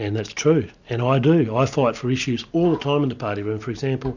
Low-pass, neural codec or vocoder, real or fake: 7.2 kHz; none; real